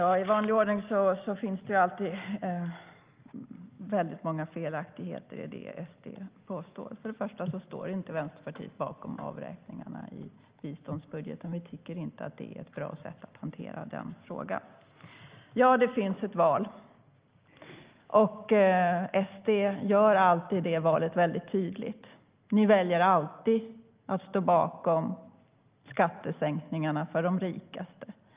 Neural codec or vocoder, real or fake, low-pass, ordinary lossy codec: none; real; 3.6 kHz; Opus, 24 kbps